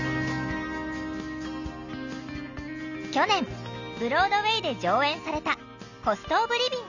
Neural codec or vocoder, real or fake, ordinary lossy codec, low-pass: none; real; none; 7.2 kHz